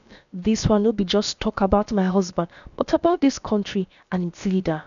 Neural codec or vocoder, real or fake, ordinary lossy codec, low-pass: codec, 16 kHz, about 1 kbps, DyCAST, with the encoder's durations; fake; none; 7.2 kHz